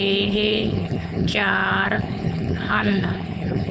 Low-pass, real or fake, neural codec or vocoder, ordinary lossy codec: none; fake; codec, 16 kHz, 4.8 kbps, FACodec; none